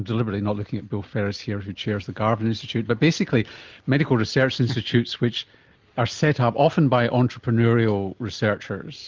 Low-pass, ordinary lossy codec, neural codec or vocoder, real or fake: 7.2 kHz; Opus, 32 kbps; none; real